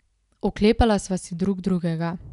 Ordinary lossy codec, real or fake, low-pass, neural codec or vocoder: none; real; 10.8 kHz; none